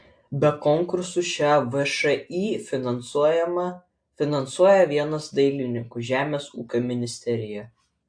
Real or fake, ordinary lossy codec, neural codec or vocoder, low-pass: real; AAC, 64 kbps; none; 9.9 kHz